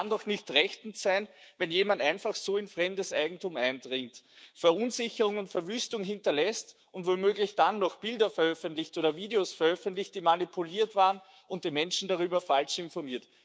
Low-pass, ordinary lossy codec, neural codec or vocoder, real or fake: none; none; codec, 16 kHz, 6 kbps, DAC; fake